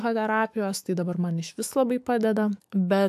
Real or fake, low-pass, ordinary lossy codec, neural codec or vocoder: fake; 14.4 kHz; AAC, 96 kbps; autoencoder, 48 kHz, 128 numbers a frame, DAC-VAE, trained on Japanese speech